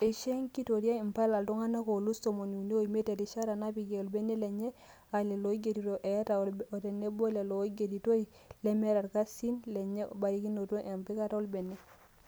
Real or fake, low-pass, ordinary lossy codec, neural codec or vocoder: real; none; none; none